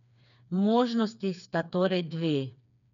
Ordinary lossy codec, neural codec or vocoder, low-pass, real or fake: none; codec, 16 kHz, 4 kbps, FreqCodec, smaller model; 7.2 kHz; fake